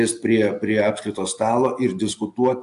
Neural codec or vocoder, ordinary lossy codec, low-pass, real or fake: none; AAC, 64 kbps; 10.8 kHz; real